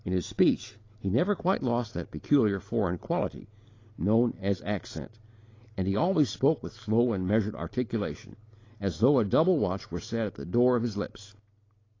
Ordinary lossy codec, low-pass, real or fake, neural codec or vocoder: AAC, 32 kbps; 7.2 kHz; real; none